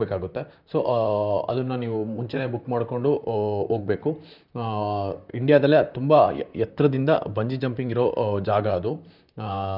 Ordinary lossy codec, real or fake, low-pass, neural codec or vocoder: none; fake; 5.4 kHz; vocoder, 44.1 kHz, 128 mel bands, Pupu-Vocoder